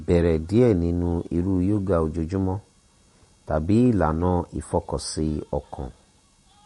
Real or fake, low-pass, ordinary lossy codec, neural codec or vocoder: fake; 19.8 kHz; MP3, 48 kbps; vocoder, 48 kHz, 128 mel bands, Vocos